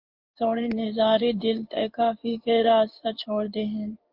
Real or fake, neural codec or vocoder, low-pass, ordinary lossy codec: fake; codec, 16 kHz in and 24 kHz out, 1 kbps, XY-Tokenizer; 5.4 kHz; Opus, 24 kbps